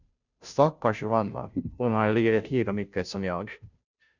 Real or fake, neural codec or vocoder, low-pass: fake; codec, 16 kHz, 0.5 kbps, FunCodec, trained on Chinese and English, 25 frames a second; 7.2 kHz